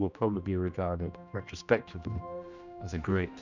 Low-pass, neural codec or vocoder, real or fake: 7.2 kHz; codec, 16 kHz, 1 kbps, X-Codec, HuBERT features, trained on general audio; fake